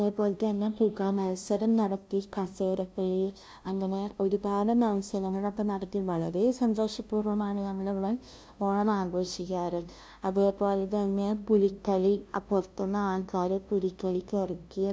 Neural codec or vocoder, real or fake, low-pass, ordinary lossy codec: codec, 16 kHz, 0.5 kbps, FunCodec, trained on LibriTTS, 25 frames a second; fake; none; none